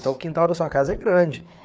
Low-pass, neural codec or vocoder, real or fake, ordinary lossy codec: none; codec, 16 kHz, 4 kbps, FreqCodec, larger model; fake; none